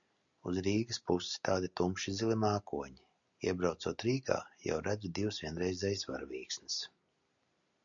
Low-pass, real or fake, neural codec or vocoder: 7.2 kHz; real; none